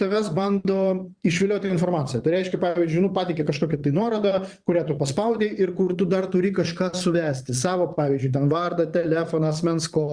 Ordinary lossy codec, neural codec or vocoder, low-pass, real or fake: Opus, 64 kbps; vocoder, 22.05 kHz, 80 mel bands, Vocos; 9.9 kHz; fake